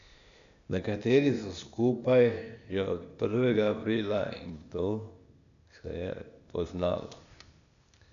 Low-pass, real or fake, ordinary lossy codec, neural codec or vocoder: 7.2 kHz; fake; none; codec, 16 kHz, 0.8 kbps, ZipCodec